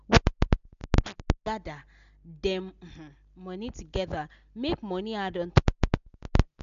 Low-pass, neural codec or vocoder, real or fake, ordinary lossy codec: 7.2 kHz; none; real; none